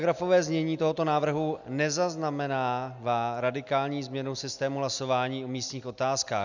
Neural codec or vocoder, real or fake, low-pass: none; real; 7.2 kHz